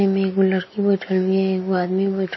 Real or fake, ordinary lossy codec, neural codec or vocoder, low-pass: real; MP3, 24 kbps; none; 7.2 kHz